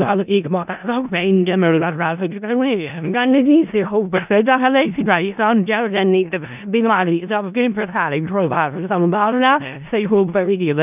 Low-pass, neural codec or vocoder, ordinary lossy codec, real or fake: 3.6 kHz; codec, 16 kHz in and 24 kHz out, 0.4 kbps, LongCat-Audio-Codec, four codebook decoder; none; fake